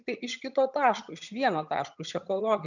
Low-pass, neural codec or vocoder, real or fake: 7.2 kHz; vocoder, 22.05 kHz, 80 mel bands, HiFi-GAN; fake